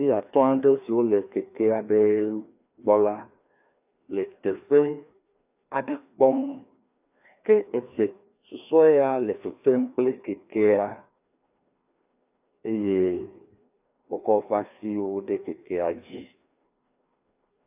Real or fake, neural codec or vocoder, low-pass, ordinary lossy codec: fake; codec, 16 kHz, 2 kbps, FreqCodec, larger model; 3.6 kHz; AAC, 32 kbps